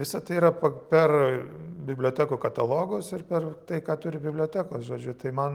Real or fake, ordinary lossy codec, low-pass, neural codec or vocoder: real; Opus, 24 kbps; 19.8 kHz; none